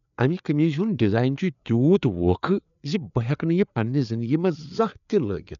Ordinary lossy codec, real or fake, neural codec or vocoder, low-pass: none; fake; codec, 16 kHz, 4 kbps, FreqCodec, larger model; 7.2 kHz